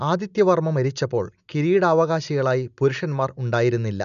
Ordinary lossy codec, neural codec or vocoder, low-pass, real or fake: none; none; 7.2 kHz; real